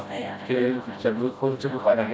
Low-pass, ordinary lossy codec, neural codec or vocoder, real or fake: none; none; codec, 16 kHz, 0.5 kbps, FreqCodec, smaller model; fake